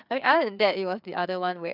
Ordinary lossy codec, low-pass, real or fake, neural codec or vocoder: none; 5.4 kHz; fake; codec, 16 kHz, 4 kbps, FreqCodec, larger model